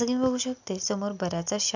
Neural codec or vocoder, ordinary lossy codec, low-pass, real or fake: none; none; 7.2 kHz; real